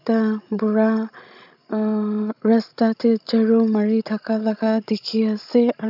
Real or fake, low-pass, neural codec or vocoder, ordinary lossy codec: fake; 5.4 kHz; codec, 16 kHz, 16 kbps, FreqCodec, larger model; none